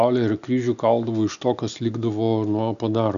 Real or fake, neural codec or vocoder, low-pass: real; none; 7.2 kHz